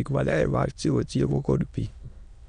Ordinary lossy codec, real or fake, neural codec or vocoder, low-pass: none; fake; autoencoder, 22.05 kHz, a latent of 192 numbers a frame, VITS, trained on many speakers; 9.9 kHz